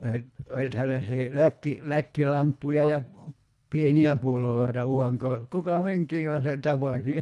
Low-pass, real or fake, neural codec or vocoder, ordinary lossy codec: none; fake; codec, 24 kHz, 1.5 kbps, HILCodec; none